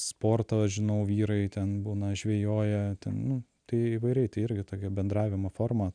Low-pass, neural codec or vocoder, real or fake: 9.9 kHz; none; real